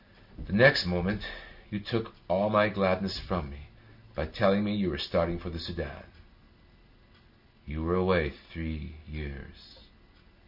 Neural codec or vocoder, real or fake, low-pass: none; real; 5.4 kHz